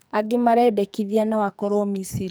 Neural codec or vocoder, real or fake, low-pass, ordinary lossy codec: codec, 44.1 kHz, 2.6 kbps, SNAC; fake; none; none